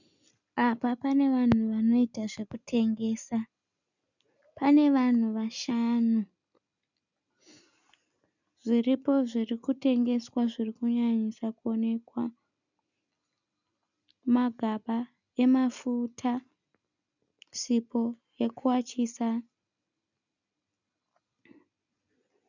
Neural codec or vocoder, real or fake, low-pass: none; real; 7.2 kHz